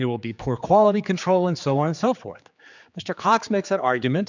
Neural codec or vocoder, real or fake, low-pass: codec, 16 kHz, 4 kbps, X-Codec, HuBERT features, trained on general audio; fake; 7.2 kHz